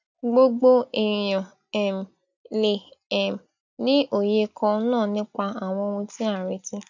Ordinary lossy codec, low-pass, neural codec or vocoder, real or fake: none; 7.2 kHz; none; real